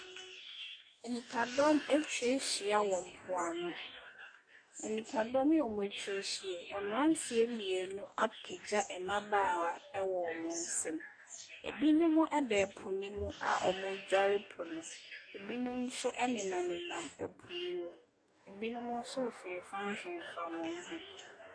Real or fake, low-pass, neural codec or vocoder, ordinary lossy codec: fake; 9.9 kHz; codec, 44.1 kHz, 2.6 kbps, DAC; AAC, 64 kbps